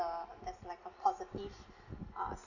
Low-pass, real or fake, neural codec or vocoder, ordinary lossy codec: 7.2 kHz; real; none; none